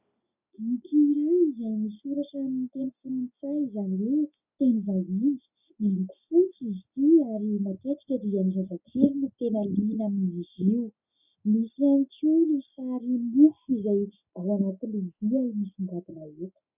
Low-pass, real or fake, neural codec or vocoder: 3.6 kHz; real; none